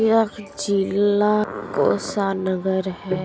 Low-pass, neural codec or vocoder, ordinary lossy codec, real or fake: none; none; none; real